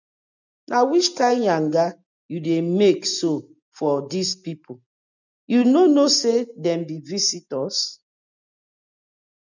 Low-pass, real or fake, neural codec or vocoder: 7.2 kHz; real; none